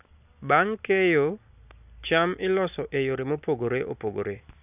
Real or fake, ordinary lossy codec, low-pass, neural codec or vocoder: real; none; 3.6 kHz; none